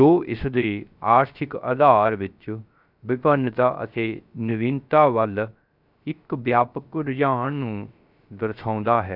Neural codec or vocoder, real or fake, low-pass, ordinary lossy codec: codec, 16 kHz, 0.3 kbps, FocalCodec; fake; 5.4 kHz; none